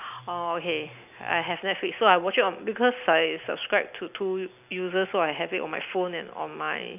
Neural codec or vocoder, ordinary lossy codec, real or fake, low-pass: none; none; real; 3.6 kHz